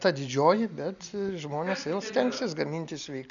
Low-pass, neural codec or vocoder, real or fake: 7.2 kHz; none; real